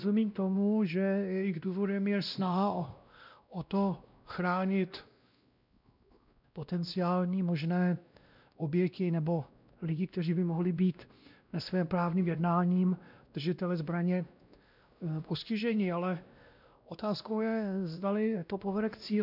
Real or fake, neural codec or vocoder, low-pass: fake; codec, 16 kHz, 1 kbps, X-Codec, WavLM features, trained on Multilingual LibriSpeech; 5.4 kHz